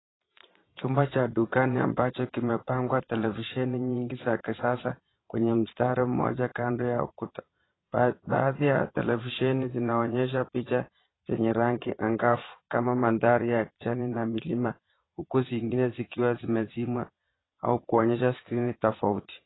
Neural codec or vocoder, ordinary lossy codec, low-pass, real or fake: vocoder, 22.05 kHz, 80 mel bands, Vocos; AAC, 16 kbps; 7.2 kHz; fake